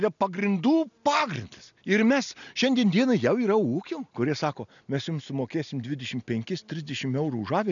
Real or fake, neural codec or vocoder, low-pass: real; none; 7.2 kHz